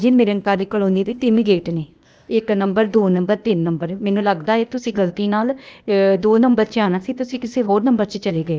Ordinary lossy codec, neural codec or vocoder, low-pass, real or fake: none; codec, 16 kHz, 0.8 kbps, ZipCodec; none; fake